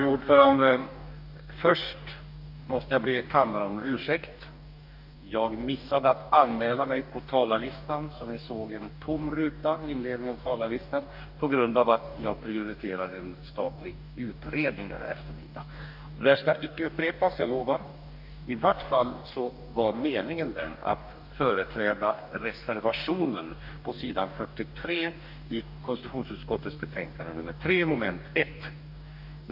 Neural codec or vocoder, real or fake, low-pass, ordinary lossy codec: codec, 44.1 kHz, 2.6 kbps, DAC; fake; 5.4 kHz; none